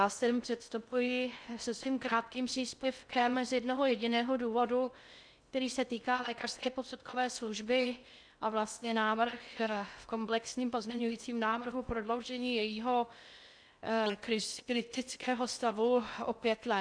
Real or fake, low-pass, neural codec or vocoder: fake; 9.9 kHz; codec, 16 kHz in and 24 kHz out, 0.6 kbps, FocalCodec, streaming, 2048 codes